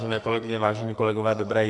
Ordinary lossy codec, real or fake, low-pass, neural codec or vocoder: MP3, 96 kbps; fake; 10.8 kHz; codec, 44.1 kHz, 2.6 kbps, SNAC